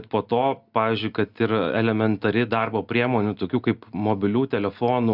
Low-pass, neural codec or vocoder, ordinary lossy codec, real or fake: 5.4 kHz; none; Opus, 64 kbps; real